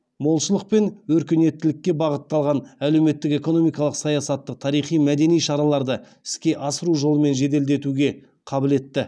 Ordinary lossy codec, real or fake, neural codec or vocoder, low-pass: none; real; none; none